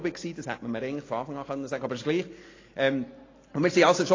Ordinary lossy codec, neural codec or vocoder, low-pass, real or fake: AAC, 32 kbps; none; 7.2 kHz; real